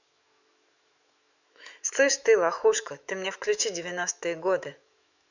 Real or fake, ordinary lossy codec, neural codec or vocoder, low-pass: fake; none; autoencoder, 48 kHz, 128 numbers a frame, DAC-VAE, trained on Japanese speech; 7.2 kHz